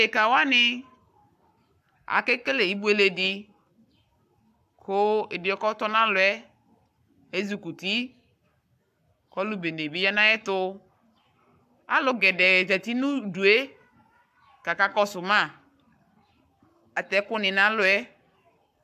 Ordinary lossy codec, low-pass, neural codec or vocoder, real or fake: AAC, 96 kbps; 14.4 kHz; codec, 44.1 kHz, 7.8 kbps, Pupu-Codec; fake